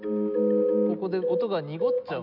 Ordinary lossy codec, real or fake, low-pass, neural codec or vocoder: none; real; 5.4 kHz; none